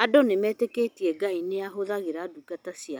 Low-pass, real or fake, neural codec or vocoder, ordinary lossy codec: none; real; none; none